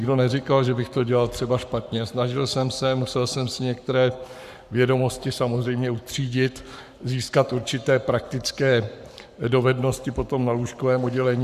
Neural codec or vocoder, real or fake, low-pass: codec, 44.1 kHz, 7.8 kbps, Pupu-Codec; fake; 14.4 kHz